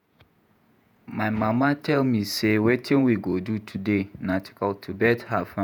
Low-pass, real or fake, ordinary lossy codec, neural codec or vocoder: none; fake; none; vocoder, 48 kHz, 128 mel bands, Vocos